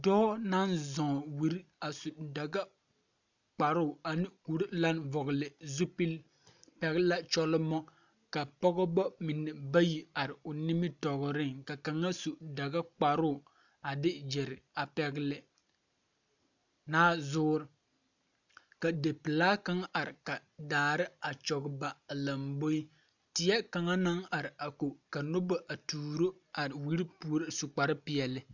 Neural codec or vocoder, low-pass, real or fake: none; 7.2 kHz; real